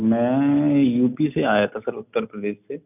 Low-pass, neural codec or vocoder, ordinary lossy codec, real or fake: 3.6 kHz; none; none; real